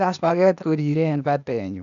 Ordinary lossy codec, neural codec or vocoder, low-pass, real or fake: MP3, 96 kbps; codec, 16 kHz, 0.8 kbps, ZipCodec; 7.2 kHz; fake